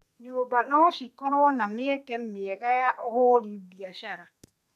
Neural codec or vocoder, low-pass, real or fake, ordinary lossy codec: codec, 32 kHz, 1.9 kbps, SNAC; 14.4 kHz; fake; MP3, 96 kbps